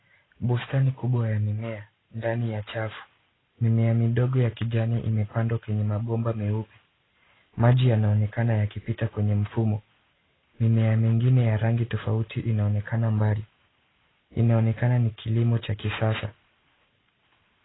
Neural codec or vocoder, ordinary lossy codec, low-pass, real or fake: none; AAC, 16 kbps; 7.2 kHz; real